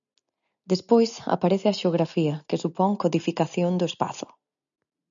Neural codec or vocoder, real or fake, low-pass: none; real; 7.2 kHz